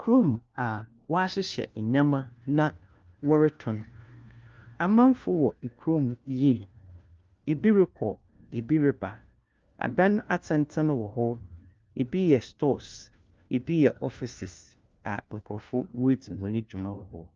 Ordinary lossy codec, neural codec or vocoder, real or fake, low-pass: Opus, 24 kbps; codec, 16 kHz, 1 kbps, FunCodec, trained on LibriTTS, 50 frames a second; fake; 7.2 kHz